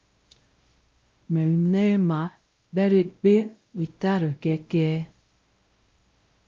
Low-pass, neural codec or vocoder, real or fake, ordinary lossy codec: 7.2 kHz; codec, 16 kHz, 0.5 kbps, X-Codec, WavLM features, trained on Multilingual LibriSpeech; fake; Opus, 24 kbps